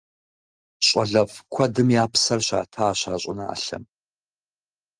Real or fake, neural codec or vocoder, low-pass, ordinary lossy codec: real; none; 9.9 kHz; Opus, 24 kbps